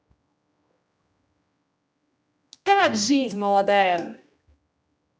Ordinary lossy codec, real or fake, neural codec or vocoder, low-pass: none; fake; codec, 16 kHz, 1 kbps, X-Codec, HuBERT features, trained on general audio; none